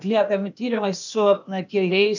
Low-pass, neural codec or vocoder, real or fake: 7.2 kHz; codec, 16 kHz, 0.8 kbps, ZipCodec; fake